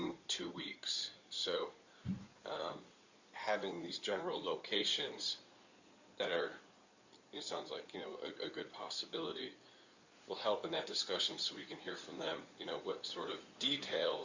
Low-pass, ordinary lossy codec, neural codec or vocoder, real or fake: 7.2 kHz; Opus, 64 kbps; codec, 16 kHz in and 24 kHz out, 2.2 kbps, FireRedTTS-2 codec; fake